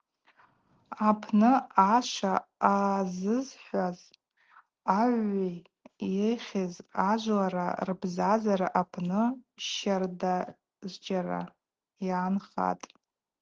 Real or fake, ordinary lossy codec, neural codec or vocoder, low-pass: real; Opus, 16 kbps; none; 7.2 kHz